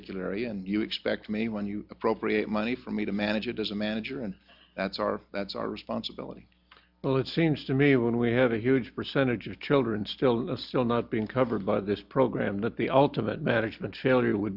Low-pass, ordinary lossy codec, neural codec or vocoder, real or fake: 5.4 kHz; Opus, 64 kbps; none; real